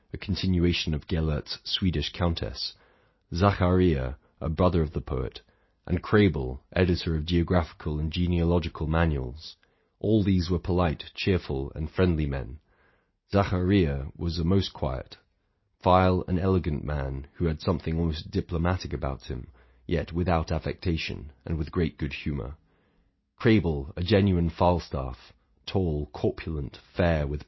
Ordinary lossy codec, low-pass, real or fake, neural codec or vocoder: MP3, 24 kbps; 7.2 kHz; real; none